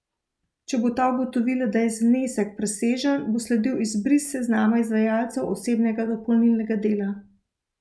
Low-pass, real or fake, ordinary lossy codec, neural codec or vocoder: none; real; none; none